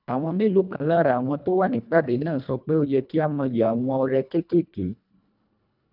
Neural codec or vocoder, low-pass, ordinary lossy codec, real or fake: codec, 24 kHz, 1.5 kbps, HILCodec; 5.4 kHz; none; fake